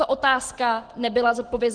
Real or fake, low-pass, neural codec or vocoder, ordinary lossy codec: real; 9.9 kHz; none; Opus, 16 kbps